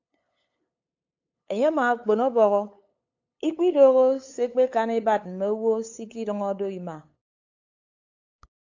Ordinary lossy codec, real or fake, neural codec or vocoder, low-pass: AAC, 48 kbps; fake; codec, 16 kHz, 8 kbps, FunCodec, trained on LibriTTS, 25 frames a second; 7.2 kHz